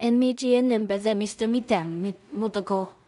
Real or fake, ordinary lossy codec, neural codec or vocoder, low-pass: fake; none; codec, 16 kHz in and 24 kHz out, 0.4 kbps, LongCat-Audio-Codec, two codebook decoder; 10.8 kHz